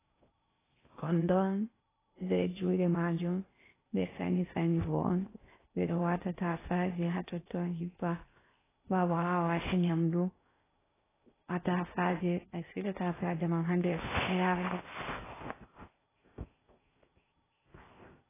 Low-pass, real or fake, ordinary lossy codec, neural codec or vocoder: 3.6 kHz; fake; AAC, 16 kbps; codec, 16 kHz in and 24 kHz out, 0.6 kbps, FocalCodec, streaming, 4096 codes